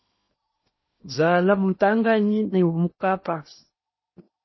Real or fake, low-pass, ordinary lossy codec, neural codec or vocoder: fake; 7.2 kHz; MP3, 24 kbps; codec, 16 kHz in and 24 kHz out, 0.8 kbps, FocalCodec, streaming, 65536 codes